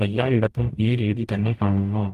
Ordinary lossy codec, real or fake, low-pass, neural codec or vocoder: Opus, 32 kbps; fake; 14.4 kHz; codec, 44.1 kHz, 0.9 kbps, DAC